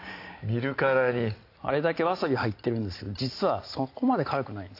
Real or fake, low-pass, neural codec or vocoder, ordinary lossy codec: real; 5.4 kHz; none; AAC, 32 kbps